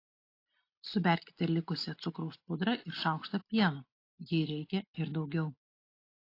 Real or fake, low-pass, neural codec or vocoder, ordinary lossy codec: real; 5.4 kHz; none; AAC, 32 kbps